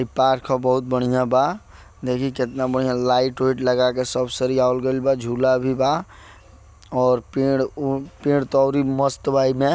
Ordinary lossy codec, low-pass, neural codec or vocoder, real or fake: none; none; none; real